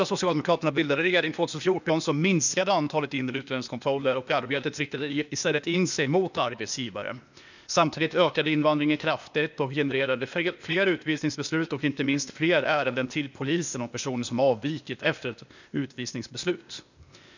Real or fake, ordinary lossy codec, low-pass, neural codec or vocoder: fake; none; 7.2 kHz; codec, 16 kHz, 0.8 kbps, ZipCodec